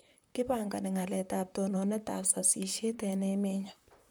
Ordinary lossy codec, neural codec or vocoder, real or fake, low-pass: none; vocoder, 44.1 kHz, 128 mel bands, Pupu-Vocoder; fake; none